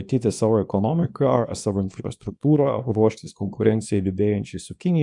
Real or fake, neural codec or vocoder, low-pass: fake; codec, 24 kHz, 0.9 kbps, WavTokenizer, small release; 10.8 kHz